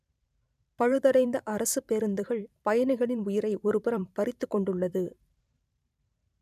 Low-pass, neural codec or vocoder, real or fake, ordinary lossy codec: 14.4 kHz; vocoder, 44.1 kHz, 128 mel bands every 512 samples, BigVGAN v2; fake; none